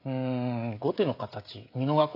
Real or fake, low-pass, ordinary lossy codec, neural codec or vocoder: fake; 5.4 kHz; AAC, 32 kbps; codec, 16 kHz, 16 kbps, FreqCodec, smaller model